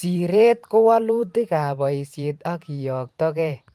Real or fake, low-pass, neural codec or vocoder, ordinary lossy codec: fake; 14.4 kHz; vocoder, 44.1 kHz, 128 mel bands every 512 samples, BigVGAN v2; Opus, 32 kbps